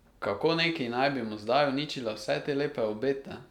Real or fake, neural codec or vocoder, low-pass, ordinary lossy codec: real; none; 19.8 kHz; none